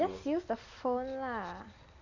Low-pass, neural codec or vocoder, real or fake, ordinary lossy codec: 7.2 kHz; none; real; none